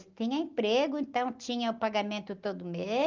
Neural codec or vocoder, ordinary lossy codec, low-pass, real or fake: none; Opus, 32 kbps; 7.2 kHz; real